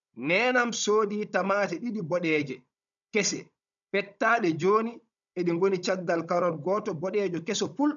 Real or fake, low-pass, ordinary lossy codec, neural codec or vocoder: fake; 7.2 kHz; none; codec, 16 kHz, 16 kbps, FunCodec, trained on Chinese and English, 50 frames a second